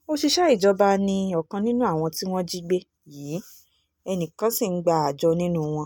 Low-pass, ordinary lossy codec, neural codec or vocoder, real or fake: none; none; none; real